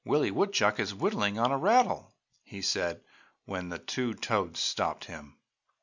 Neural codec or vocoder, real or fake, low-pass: none; real; 7.2 kHz